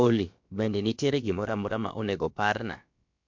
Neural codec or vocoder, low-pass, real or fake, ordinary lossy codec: codec, 16 kHz, about 1 kbps, DyCAST, with the encoder's durations; 7.2 kHz; fake; MP3, 48 kbps